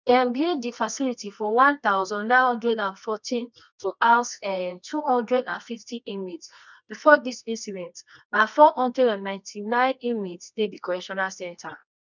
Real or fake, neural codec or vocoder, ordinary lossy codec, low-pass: fake; codec, 24 kHz, 0.9 kbps, WavTokenizer, medium music audio release; none; 7.2 kHz